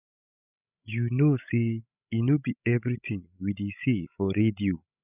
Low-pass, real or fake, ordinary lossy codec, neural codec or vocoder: 3.6 kHz; fake; none; codec, 16 kHz, 16 kbps, FreqCodec, larger model